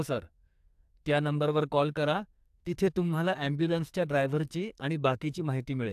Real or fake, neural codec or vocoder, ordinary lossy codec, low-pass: fake; codec, 44.1 kHz, 2.6 kbps, SNAC; AAC, 96 kbps; 14.4 kHz